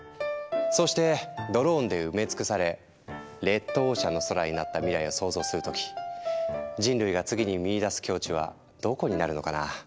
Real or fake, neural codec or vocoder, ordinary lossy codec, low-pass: real; none; none; none